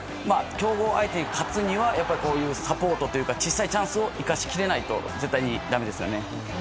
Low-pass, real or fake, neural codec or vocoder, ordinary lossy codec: none; real; none; none